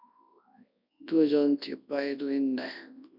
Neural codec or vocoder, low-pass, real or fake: codec, 24 kHz, 0.9 kbps, WavTokenizer, large speech release; 5.4 kHz; fake